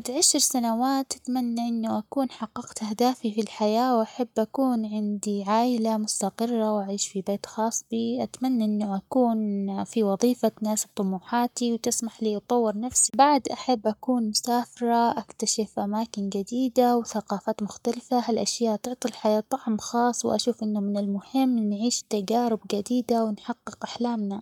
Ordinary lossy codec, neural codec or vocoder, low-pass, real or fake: none; codec, 44.1 kHz, 7.8 kbps, Pupu-Codec; 19.8 kHz; fake